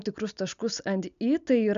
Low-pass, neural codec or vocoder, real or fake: 7.2 kHz; none; real